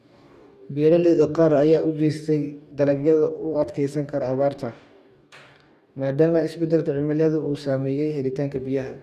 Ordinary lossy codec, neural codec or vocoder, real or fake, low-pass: none; codec, 44.1 kHz, 2.6 kbps, DAC; fake; 14.4 kHz